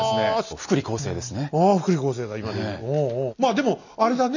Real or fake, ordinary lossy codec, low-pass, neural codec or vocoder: real; none; 7.2 kHz; none